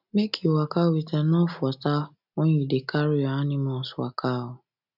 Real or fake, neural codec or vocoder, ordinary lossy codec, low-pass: real; none; none; 5.4 kHz